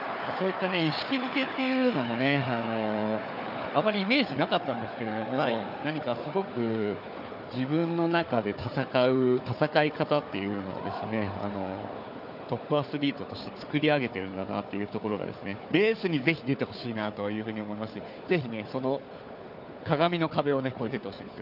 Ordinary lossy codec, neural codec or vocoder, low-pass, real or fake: none; codec, 16 kHz, 4 kbps, FunCodec, trained on Chinese and English, 50 frames a second; 5.4 kHz; fake